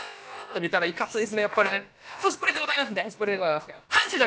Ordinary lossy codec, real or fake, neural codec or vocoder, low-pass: none; fake; codec, 16 kHz, about 1 kbps, DyCAST, with the encoder's durations; none